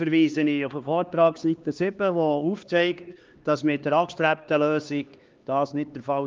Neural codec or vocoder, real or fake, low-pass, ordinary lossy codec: codec, 16 kHz, 2 kbps, X-Codec, HuBERT features, trained on LibriSpeech; fake; 7.2 kHz; Opus, 24 kbps